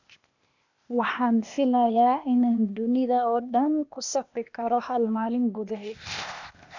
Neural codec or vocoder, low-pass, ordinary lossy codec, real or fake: codec, 16 kHz, 0.8 kbps, ZipCodec; 7.2 kHz; none; fake